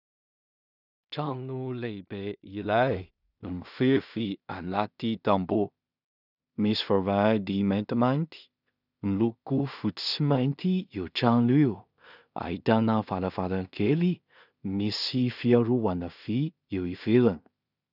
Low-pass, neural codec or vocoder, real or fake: 5.4 kHz; codec, 16 kHz in and 24 kHz out, 0.4 kbps, LongCat-Audio-Codec, two codebook decoder; fake